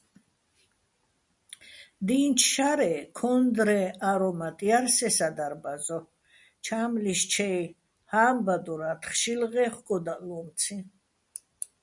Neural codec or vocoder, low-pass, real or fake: none; 10.8 kHz; real